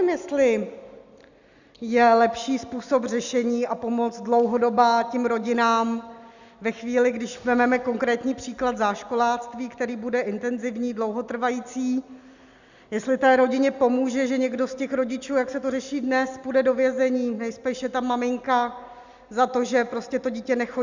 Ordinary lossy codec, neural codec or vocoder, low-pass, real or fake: Opus, 64 kbps; none; 7.2 kHz; real